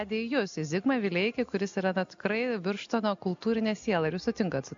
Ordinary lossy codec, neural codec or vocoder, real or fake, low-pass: MP3, 64 kbps; none; real; 7.2 kHz